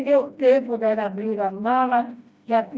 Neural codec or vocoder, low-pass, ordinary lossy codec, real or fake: codec, 16 kHz, 1 kbps, FreqCodec, smaller model; none; none; fake